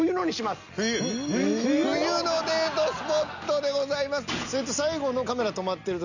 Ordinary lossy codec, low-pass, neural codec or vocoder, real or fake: none; 7.2 kHz; none; real